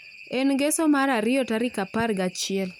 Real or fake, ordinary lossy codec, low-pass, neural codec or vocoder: real; none; 19.8 kHz; none